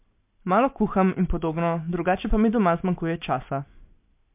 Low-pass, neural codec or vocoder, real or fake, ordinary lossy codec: 3.6 kHz; none; real; MP3, 32 kbps